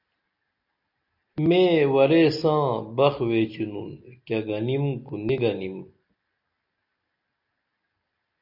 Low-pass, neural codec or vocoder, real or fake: 5.4 kHz; none; real